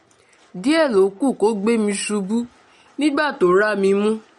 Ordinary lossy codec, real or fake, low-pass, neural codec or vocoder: MP3, 48 kbps; real; 19.8 kHz; none